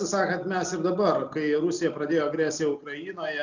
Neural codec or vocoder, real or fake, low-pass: none; real; 7.2 kHz